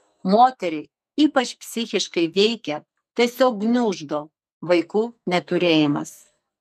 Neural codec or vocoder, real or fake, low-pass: codec, 44.1 kHz, 2.6 kbps, SNAC; fake; 14.4 kHz